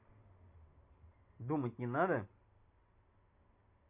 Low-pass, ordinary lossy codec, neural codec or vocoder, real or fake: 3.6 kHz; MP3, 32 kbps; none; real